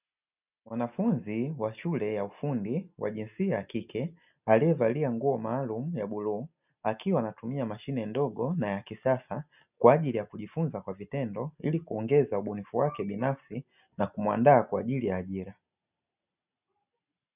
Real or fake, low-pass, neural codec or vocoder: real; 3.6 kHz; none